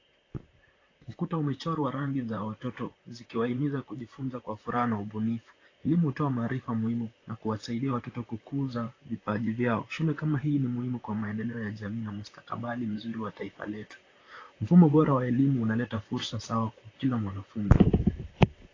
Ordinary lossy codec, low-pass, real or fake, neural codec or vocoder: AAC, 32 kbps; 7.2 kHz; fake; vocoder, 44.1 kHz, 128 mel bands, Pupu-Vocoder